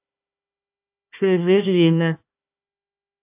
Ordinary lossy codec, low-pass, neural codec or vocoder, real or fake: AAC, 32 kbps; 3.6 kHz; codec, 16 kHz, 1 kbps, FunCodec, trained on Chinese and English, 50 frames a second; fake